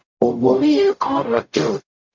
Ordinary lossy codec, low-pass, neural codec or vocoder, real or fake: MP3, 64 kbps; 7.2 kHz; codec, 44.1 kHz, 0.9 kbps, DAC; fake